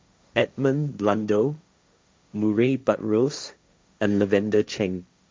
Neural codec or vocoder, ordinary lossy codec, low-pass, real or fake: codec, 16 kHz, 1.1 kbps, Voila-Tokenizer; none; none; fake